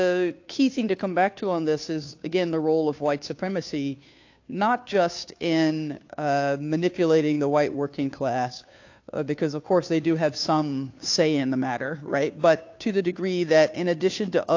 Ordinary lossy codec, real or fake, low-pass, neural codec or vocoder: AAC, 48 kbps; fake; 7.2 kHz; codec, 16 kHz, 2 kbps, FunCodec, trained on Chinese and English, 25 frames a second